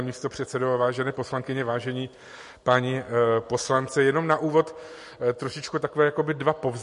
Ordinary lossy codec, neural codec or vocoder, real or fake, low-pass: MP3, 48 kbps; none; real; 14.4 kHz